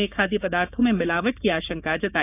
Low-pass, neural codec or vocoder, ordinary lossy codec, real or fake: 3.6 kHz; codec, 16 kHz, 6 kbps, DAC; none; fake